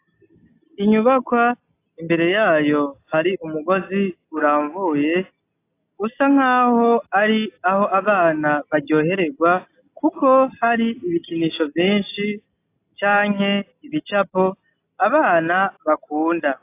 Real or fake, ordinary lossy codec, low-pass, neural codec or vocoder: real; AAC, 24 kbps; 3.6 kHz; none